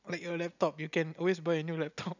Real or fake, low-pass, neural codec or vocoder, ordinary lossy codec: real; 7.2 kHz; none; none